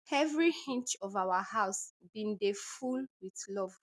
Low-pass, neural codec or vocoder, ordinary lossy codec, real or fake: none; none; none; real